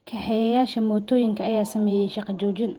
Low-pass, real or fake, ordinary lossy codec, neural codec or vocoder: 19.8 kHz; fake; Opus, 24 kbps; vocoder, 48 kHz, 128 mel bands, Vocos